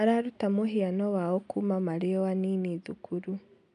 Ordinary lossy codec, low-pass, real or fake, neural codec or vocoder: none; 9.9 kHz; real; none